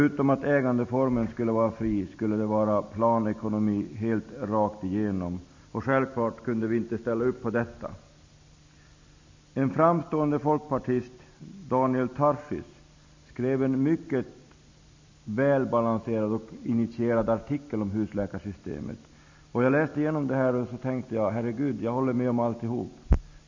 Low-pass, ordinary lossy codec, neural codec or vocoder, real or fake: 7.2 kHz; MP3, 64 kbps; none; real